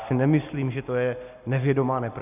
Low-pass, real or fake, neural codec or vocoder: 3.6 kHz; real; none